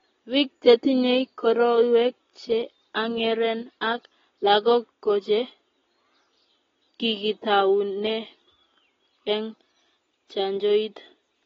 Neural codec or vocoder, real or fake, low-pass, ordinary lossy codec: none; real; 7.2 kHz; AAC, 24 kbps